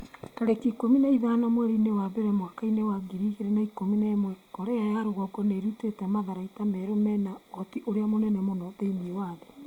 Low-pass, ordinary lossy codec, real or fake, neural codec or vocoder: 19.8 kHz; Opus, 64 kbps; real; none